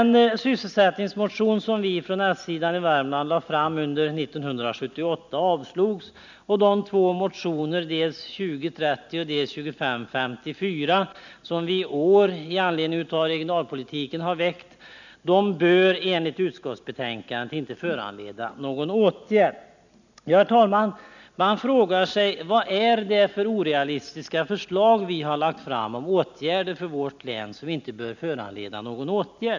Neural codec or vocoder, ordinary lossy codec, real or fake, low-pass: none; none; real; 7.2 kHz